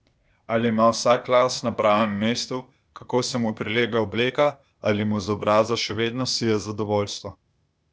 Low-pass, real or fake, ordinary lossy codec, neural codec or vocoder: none; fake; none; codec, 16 kHz, 0.8 kbps, ZipCodec